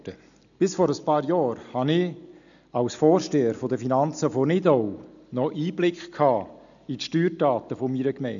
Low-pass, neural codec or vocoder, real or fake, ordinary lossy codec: 7.2 kHz; none; real; none